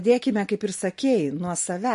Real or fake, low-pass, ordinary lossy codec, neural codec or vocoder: real; 14.4 kHz; MP3, 48 kbps; none